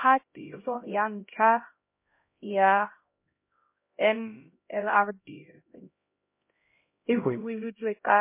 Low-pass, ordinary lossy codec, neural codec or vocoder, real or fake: 3.6 kHz; MP3, 24 kbps; codec, 16 kHz, 0.5 kbps, X-Codec, HuBERT features, trained on LibriSpeech; fake